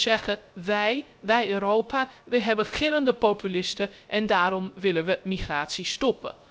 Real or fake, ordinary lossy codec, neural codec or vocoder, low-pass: fake; none; codec, 16 kHz, 0.3 kbps, FocalCodec; none